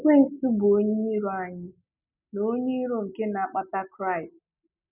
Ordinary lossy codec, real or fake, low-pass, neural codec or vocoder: none; real; 3.6 kHz; none